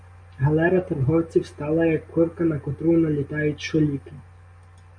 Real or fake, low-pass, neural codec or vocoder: real; 9.9 kHz; none